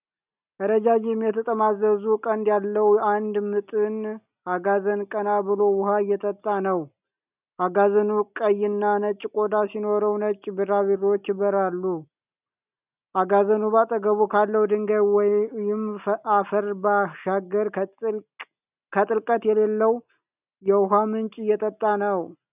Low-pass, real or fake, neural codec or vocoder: 3.6 kHz; real; none